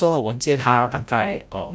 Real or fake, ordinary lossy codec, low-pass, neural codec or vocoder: fake; none; none; codec, 16 kHz, 0.5 kbps, FreqCodec, larger model